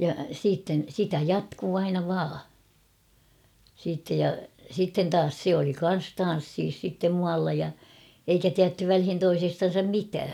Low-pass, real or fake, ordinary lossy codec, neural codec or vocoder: 19.8 kHz; real; none; none